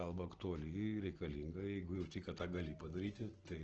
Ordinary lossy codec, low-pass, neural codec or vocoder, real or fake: Opus, 16 kbps; 7.2 kHz; none; real